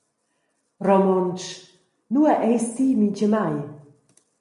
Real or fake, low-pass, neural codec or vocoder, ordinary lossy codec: real; 14.4 kHz; none; MP3, 48 kbps